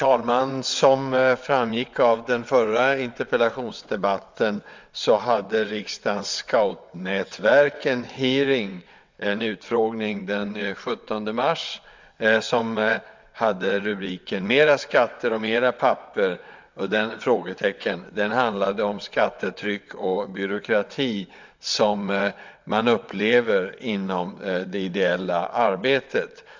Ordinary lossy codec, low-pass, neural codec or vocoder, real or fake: MP3, 64 kbps; 7.2 kHz; vocoder, 22.05 kHz, 80 mel bands, WaveNeXt; fake